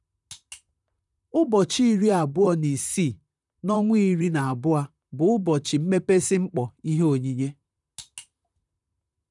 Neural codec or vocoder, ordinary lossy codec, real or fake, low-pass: vocoder, 44.1 kHz, 128 mel bands, Pupu-Vocoder; none; fake; 10.8 kHz